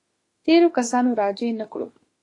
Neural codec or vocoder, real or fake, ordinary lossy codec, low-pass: autoencoder, 48 kHz, 32 numbers a frame, DAC-VAE, trained on Japanese speech; fake; AAC, 32 kbps; 10.8 kHz